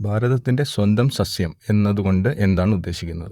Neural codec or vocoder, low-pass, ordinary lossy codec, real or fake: vocoder, 44.1 kHz, 128 mel bands, Pupu-Vocoder; 19.8 kHz; Opus, 64 kbps; fake